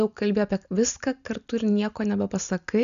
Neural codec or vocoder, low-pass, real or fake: none; 7.2 kHz; real